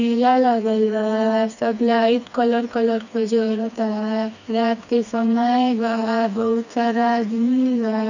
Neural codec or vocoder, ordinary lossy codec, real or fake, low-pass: codec, 16 kHz, 2 kbps, FreqCodec, smaller model; none; fake; 7.2 kHz